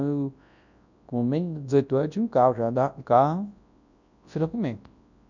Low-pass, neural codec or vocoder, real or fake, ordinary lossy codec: 7.2 kHz; codec, 24 kHz, 0.9 kbps, WavTokenizer, large speech release; fake; none